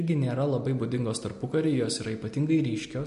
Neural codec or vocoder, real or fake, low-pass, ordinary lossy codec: none; real; 10.8 kHz; MP3, 48 kbps